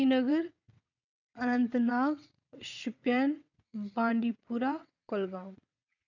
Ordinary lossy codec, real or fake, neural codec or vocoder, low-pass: none; fake; vocoder, 22.05 kHz, 80 mel bands, WaveNeXt; 7.2 kHz